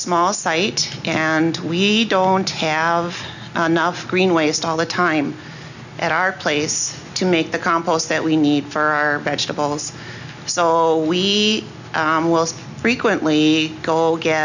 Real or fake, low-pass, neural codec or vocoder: real; 7.2 kHz; none